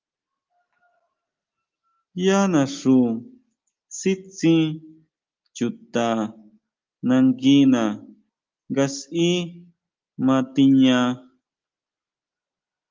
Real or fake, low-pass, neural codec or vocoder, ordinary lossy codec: real; 7.2 kHz; none; Opus, 32 kbps